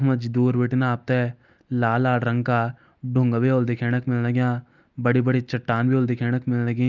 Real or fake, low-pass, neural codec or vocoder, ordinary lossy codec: real; 7.2 kHz; none; Opus, 24 kbps